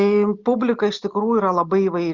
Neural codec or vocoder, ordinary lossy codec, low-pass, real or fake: none; Opus, 64 kbps; 7.2 kHz; real